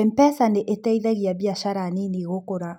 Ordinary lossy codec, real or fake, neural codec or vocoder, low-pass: none; real; none; 19.8 kHz